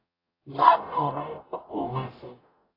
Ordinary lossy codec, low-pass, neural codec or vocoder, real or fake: AAC, 24 kbps; 5.4 kHz; codec, 44.1 kHz, 0.9 kbps, DAC; fake